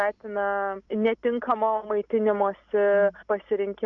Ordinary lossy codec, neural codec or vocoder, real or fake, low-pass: MP3, 64 kbps; none; real; 7.2 kHz